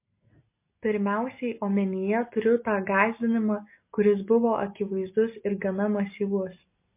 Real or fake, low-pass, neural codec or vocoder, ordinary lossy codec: real; 3.6 kHz; none; MP3, 24 kbps